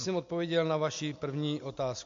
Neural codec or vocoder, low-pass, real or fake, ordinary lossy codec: none; 7.2 kHz; real; MP3, 48 kbps